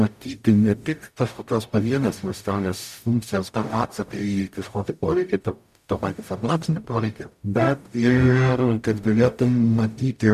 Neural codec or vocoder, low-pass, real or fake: codec, 44.1 kHz, 0.9 kbps, DAC; 14.4 kHz; fake